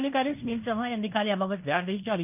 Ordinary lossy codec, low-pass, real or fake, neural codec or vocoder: none; 3.6 kHz; fake; codec, 16 kHz in and 24 kHz out, 0.9 kbps, LongCat-Audio-Codec, fine tuned four codebook decoder